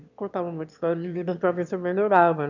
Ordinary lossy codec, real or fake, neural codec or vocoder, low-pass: none; fake; autoencoder, 22.05 kHz, a latent of 192 numbers a frame, VITS, trained on one speaker; 7.2 kHz